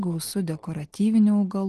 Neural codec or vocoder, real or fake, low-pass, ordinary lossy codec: none; real; 10.8 kHz; Opus, 16 kbps